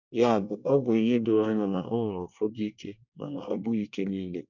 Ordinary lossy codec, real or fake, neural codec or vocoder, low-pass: none; fake; codec, 24 kHz, 1 kbps, SNAC; 7.2 kHz